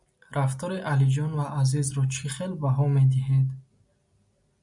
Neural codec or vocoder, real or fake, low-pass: none; real; 10.8 kHz